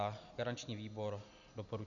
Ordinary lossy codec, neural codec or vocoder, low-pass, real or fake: MP3, 96 kbps; none; 7.2 kHz; real